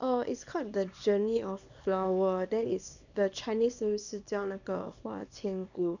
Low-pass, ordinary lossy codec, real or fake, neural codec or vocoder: 7.2 kHz; none; fake; codec, 24 kHz, 0.9 kbps, WavTokenizer, small release